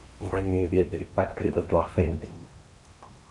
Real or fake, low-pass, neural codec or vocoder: fake; 10.8 kHz; codec, 16 kHz in and 24 kHz out, 0.8 kbps, FocalCodec, streaming, 65536 codes